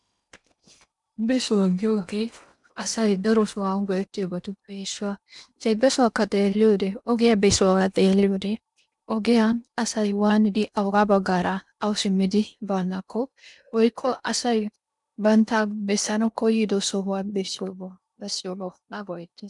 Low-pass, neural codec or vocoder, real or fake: 10.8 kHz; codec, 16 kHz in and 24 kHz out, 0.8 kbps, FocalCodec, streaming, 65536 codes; fake